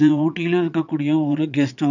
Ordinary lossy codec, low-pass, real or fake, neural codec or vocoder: none; 7.2 kHz; fake; vocoder, 22.05 kHz, 80 mel bands, Vocos